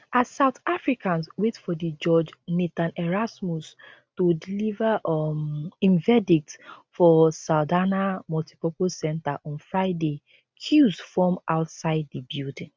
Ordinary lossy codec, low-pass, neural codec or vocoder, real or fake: none; none; none; real